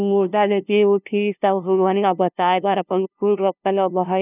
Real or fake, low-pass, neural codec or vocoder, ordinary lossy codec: fake; 3.6 kHz; codec, 16 kHz, 0.5 kbps, FunCodec, trained on LibriTTS, 25 frames a second; none